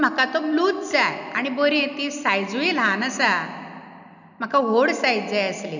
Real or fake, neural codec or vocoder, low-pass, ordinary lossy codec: real; none; 7.2 kHz; none